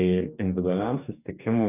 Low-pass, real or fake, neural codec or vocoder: 3.6 kHz; fake; codec, 44.1 kHz, 2.6 kbps, DAC